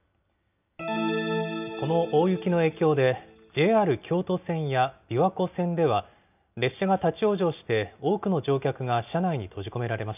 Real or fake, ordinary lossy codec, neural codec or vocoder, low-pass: real; none; none; 3.6 kHz